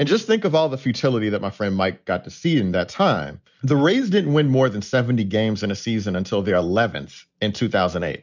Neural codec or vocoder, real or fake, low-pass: none; real; 7.2 kHz